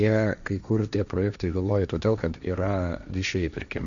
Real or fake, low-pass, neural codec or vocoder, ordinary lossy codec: fake; 7.2 kHz; codec, 16 kHz, 1.1 kbps, Voila-Tokenizer; MP3, 96 kbps